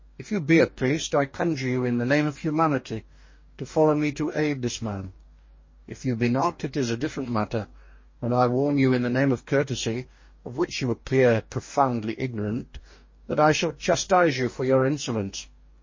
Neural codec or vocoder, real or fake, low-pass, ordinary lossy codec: codec, 44.1 kHz, 2.6 kbps, DAC; fake; 7.2 kHz; MP3, 32 kbps